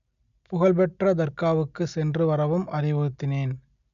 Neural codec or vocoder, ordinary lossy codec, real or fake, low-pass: none; none; real; 7.2 kHz